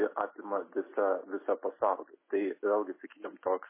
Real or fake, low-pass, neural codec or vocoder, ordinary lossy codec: real; 3.6 kHz; none; MP3, 16 kbps